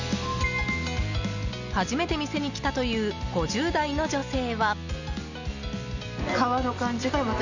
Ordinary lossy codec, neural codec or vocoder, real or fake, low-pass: none; none; real; 7.2 kHz